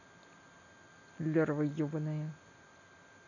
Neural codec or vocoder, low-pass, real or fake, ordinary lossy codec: none; 7.2 kHz; real; none